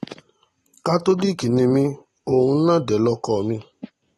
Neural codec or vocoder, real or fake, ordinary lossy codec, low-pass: none; real; AAC, 32 kbps; 19.8 kHz